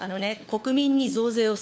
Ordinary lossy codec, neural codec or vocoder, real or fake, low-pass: none; codec, 16 kHz, 4 kbps, FunCodec, trained on LibriTTS, 50 frames a second; fake; none